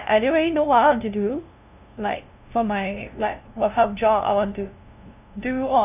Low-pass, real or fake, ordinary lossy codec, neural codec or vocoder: 3.6 kHz; fake; none; codec, 16 kHz, 0.5 kbps, FunCodec, trained on LibriTTS, 25 frames a second